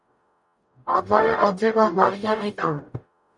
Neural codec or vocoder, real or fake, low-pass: codec, 44.1 kHz, 0.9 kbps, DAC; fake; 10.8 kHz